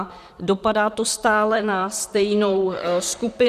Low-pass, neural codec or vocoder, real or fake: 14.4 kHz; vocoder, 44.1 kHz, 128 mel bands, Pupu-Vocoder; fake